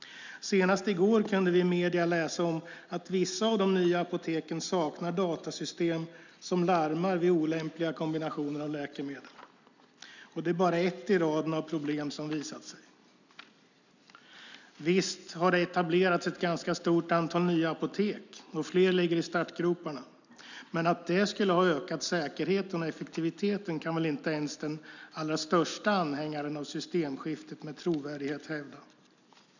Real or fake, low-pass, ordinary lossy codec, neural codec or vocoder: real; 7.2 kHz; none; none